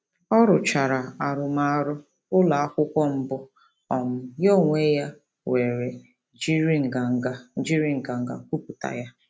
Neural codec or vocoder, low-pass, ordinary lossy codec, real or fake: none; none; none; real